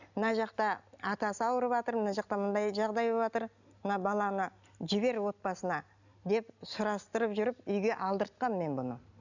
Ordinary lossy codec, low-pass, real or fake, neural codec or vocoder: none; 7.2 kHz; real; none